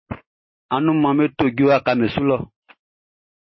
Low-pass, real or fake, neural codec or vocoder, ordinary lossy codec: 7.2 kHz; real; none; MP3, 24 kbps